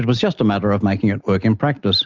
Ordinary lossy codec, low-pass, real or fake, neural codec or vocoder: Opus, 32 kbps; 7.2 kHz; real; none